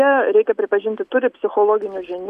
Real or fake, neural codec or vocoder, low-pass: real; none; 14.4 kHz